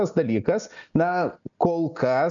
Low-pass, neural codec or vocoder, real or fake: 7.2 kHz; none; real